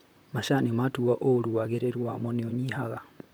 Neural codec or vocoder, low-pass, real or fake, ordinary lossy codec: vocoder, 44.1 kHz, 128 mel bands, Pupu-Vocoder; none; fake; none